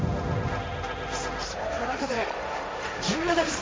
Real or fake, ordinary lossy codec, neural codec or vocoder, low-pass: fake; none; codec, 16 kHz, 1.1 kbps, Voila-Tokenizer; none